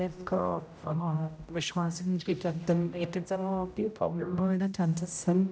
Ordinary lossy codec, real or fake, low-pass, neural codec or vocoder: none; fake; none; codec, 16 kHz, 0.5 kbps, X-Codec, HuBERT features, trained on general audio